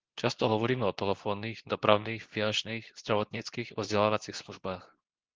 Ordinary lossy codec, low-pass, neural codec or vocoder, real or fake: Opus, 16 kbps; 7.2 kHz; codec, 24 kHz, 0.9 kbps, WavTokenizer, medium speech release version 2; fake